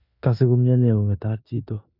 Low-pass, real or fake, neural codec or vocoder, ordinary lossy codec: 5.4 kHz; fake; codec, 16 kHz in and 24 kHz out, 0.9 kbps, LongCat-Audio-Codec, four codebook decoder; none